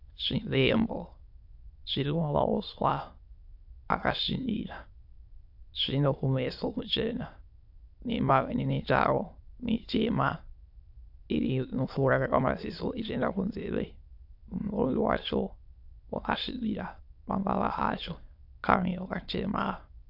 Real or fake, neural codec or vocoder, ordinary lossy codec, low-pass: fake; autoencoder, 22.05 kHz, a latent of 192 numbers a frame, VITS, trained on many speakers; AAC, 48 kbps; 5.4 kHz